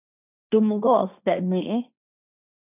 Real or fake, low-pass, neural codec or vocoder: fake; 3.6 kHz; codec, 16 kHz, 1.1 kbps, Voila-Tokenizer